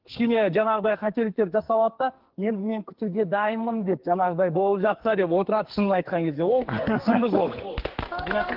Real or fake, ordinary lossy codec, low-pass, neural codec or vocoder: fake; Opus, 16 kbps; 5.4 kHz; codec, 44.1 kHz, 2.6 kbps, SNAC